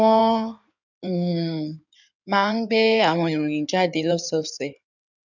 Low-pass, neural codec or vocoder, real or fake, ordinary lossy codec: 7.2 kHz; codec, 16 kHz in and 24 kHz out, 2.2 kbps, FireRedTTS-2 codec; fake; none